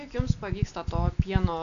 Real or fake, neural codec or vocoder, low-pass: real; none; 7.2 kHz